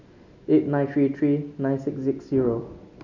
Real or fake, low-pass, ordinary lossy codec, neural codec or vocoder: real; 7.2 kHz; none; none